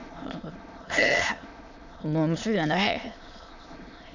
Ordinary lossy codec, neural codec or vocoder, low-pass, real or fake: none; autoencoder, 22.05 kHz, a latent of 192 numbers a frame, VITS, trained on many speakers; 7.2 kHz; fake